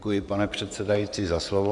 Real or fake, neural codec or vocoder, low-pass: fake; vocoder, 24 kHz, 100 mel bands, Vocos; 10.8 kHz